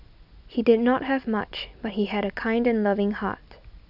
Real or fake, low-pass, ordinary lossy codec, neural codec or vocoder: real; 5.4 kHz; none; none